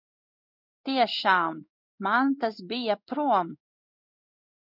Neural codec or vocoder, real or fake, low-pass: codec, 16 kHz in and 24 kHz out, 1 kbps, XY-Tokenizer; fake; 5.4 kHz